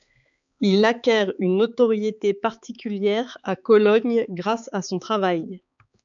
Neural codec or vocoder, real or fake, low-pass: codec, 16 kHz, 4 kbps, X-Codec, HuBERT features, trained on balanced general audio; fake; 7.2 kHz